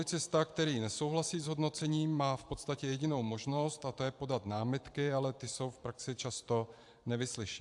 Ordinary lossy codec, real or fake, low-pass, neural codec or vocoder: AAC, 64 kbps; fake; 10.8 kHz; vocoder, 44.1 kHz, 128 mel bands every 512 samples, BigVGAN v2